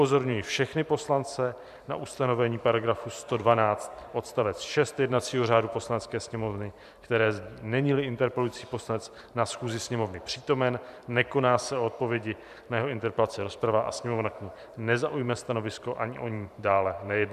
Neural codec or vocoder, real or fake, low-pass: vocoder, 44.1 kHz, 128 mel bands every 512 samples, BigVGAN v2; fake; 14.4 kHz